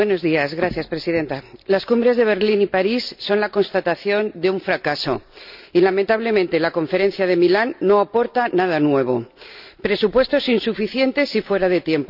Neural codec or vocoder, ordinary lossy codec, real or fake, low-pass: none; none; real; 5.4 kHz